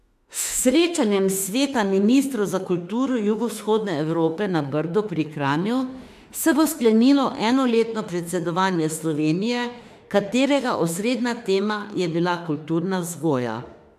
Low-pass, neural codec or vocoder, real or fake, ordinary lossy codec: 14.4 kHz; autoencoder, 48 kHz, 32 numbers a frame, DAC-VAE, trained on Japanese speech; fake; AAC, 96 kbps